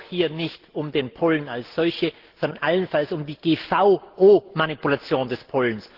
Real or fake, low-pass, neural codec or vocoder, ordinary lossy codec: real; 5.4 kHz; none; Opus, 16 kbps